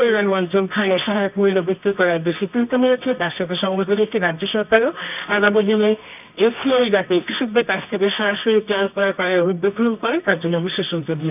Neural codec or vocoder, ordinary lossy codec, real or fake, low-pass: codec, 24 kHz, 0.9 kbps, WavTokenizer, medium music audio release; none; fake; 3.6 kHz